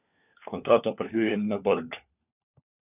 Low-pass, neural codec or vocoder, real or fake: 3.6 kHz; codec, 16 kHz, 4 kbps, FunCodec, trained on LibriTTS, 50 frames a second; fake